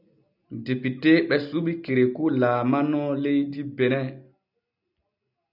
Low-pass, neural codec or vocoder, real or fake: 5.4 kHz; none; real